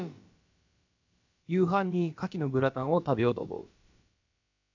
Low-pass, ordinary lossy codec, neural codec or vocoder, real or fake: 7.2 kHz; AAC, 48 kbps; codec, 16 kHz, about 1 kbps, DyCAST, with the encoder's durations; fake